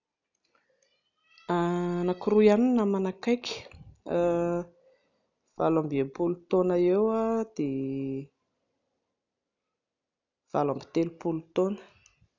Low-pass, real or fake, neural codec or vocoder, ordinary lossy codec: 7.2 kHz; real; none; none